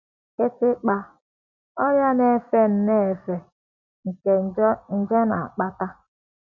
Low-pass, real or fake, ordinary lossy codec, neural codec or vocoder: 7.2 kHz; real; MP3, 64 kbps; none